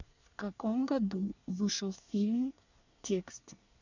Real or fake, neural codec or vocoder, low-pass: fake; codec, 24 kHz, 1 kbps, SNAC; 7.2 kHz